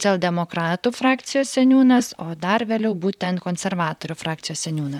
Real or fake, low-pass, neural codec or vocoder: fake; 19.8 kHz; vocoder, 44.1 kHz, 128 mel bands, Pupu-Vocoder